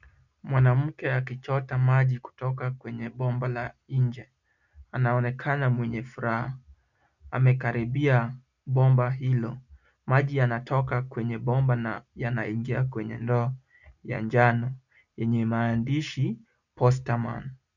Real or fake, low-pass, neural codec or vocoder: real; 7.2 kHz; none